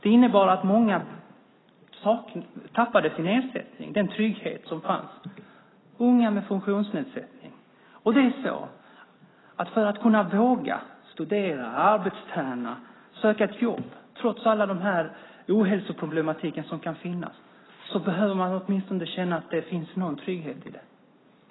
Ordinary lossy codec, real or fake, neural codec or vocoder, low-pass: AAC, 16 kbps; real; none; 7.2 kHz